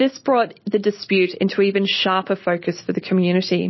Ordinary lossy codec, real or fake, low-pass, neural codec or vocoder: MP3, 24 kbps; real; 7.2 kHz; none